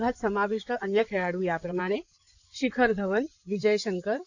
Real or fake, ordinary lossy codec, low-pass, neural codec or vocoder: fake; none; 7.2 kHz; codec, 16 kHz in and 24 kHz out, 2.2 kbps, FireRedTTS-2 codec